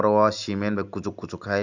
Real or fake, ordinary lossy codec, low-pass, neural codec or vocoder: real; none; 7.2 kHz; none